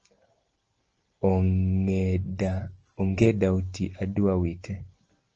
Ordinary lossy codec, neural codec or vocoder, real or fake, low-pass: Opus, 16 kbps; none; real; 7.2 kHz